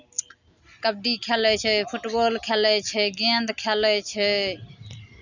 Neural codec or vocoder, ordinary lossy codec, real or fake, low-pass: none; none; real; 7.2 kHz